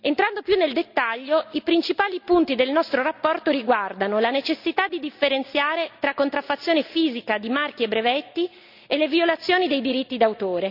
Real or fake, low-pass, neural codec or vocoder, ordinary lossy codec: real; 5.4 kHz; none; none